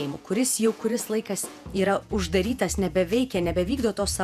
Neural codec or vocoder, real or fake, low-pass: vocoder, 48 kHz, 128 mel bands, Vocos; fake; 14.4 kHz